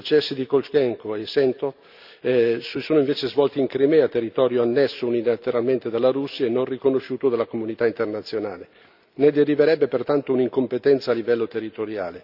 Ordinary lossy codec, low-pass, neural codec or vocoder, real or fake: none; 5.4 kHz; none; real